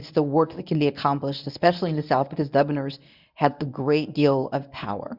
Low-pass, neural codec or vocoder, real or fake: 5.4 kHz; codec, 24 kHz, 0.9 kbps, WavTokenizer, medium speech release version 1; fake